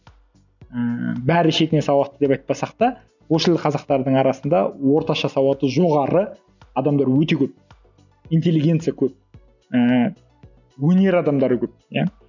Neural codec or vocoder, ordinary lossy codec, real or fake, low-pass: none; none; real; 7.2 kHz